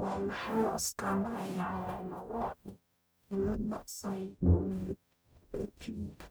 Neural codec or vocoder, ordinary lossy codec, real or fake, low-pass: codec, 44.1 kHz, 0.9 kbps, DAC; none; fake; none